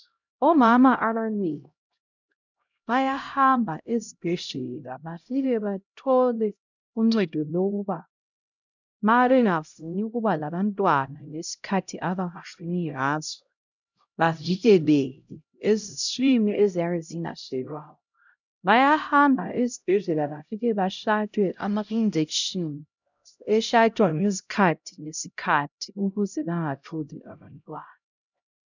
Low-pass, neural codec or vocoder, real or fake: 7.2 kHz; codec, 16 kHz, 0.5 kbps, X-Codec, HuBERT features, trained on LibriSpeech; fake